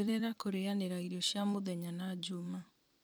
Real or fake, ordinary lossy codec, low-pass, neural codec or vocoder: fake; none; none; vocoder, 44.1 kHz, 128 mel bands every 256 samples, BigVGAN v2